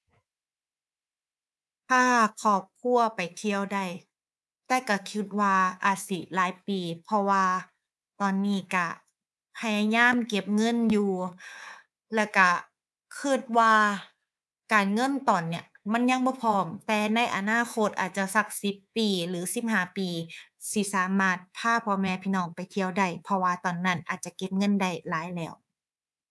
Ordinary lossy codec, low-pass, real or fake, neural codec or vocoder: none; none; fake; codec, 24 kHz, 3.1 kbps, DualCodec